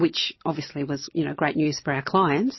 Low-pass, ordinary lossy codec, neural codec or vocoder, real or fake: 7.2 kHz; MP3, 24 kbps; none; real